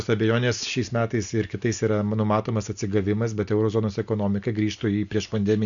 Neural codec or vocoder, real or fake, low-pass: none; real; 7.2 kHz